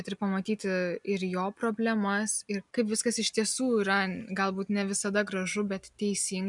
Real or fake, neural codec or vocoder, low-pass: real; none; 10.8 kHz